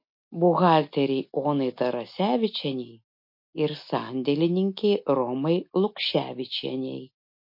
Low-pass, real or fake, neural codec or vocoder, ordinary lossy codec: 5.4 kHz; real; none; MP3, 32 kbps